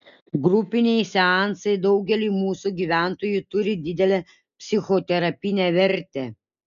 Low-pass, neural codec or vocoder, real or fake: 7.2 kHz; none; real